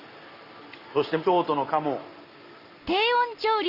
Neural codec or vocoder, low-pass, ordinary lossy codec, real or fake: none; 5.4 kHz; Opus, 64 kbps; real